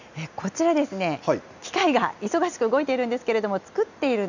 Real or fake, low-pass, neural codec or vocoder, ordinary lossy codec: real; 7.2 kHz; none; none